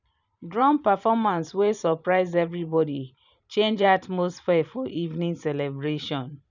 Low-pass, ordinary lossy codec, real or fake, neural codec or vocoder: 7.2 kHz; none; fake; vocoder, 44.1 kHz, 128 mel bands every 512 samples, BigVGAN v2